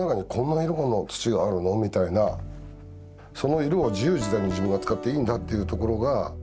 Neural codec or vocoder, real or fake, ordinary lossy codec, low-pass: none; real; none; none